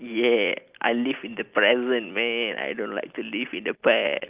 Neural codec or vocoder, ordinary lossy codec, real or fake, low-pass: none; Opus, 24 kbps; real; 3.6 kHz